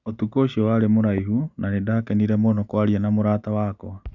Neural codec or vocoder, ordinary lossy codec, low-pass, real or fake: none; Opus, 64 kbps; 7.2 kHz; real